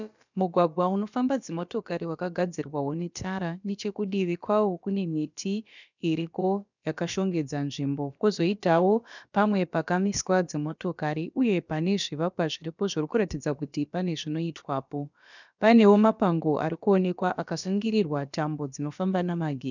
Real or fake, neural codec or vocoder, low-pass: fake; codec, 16 kHz, about 1 kbps, DyCAST, with the encoder's durations; 7.2 kHz